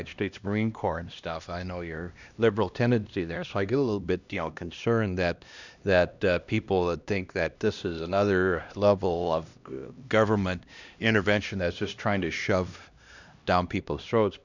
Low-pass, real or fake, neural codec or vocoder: 7.2 kHz; fake; codec, 16 kHz, 1 kbps, X-Codec, HuBERT features, trained on LibriSpeech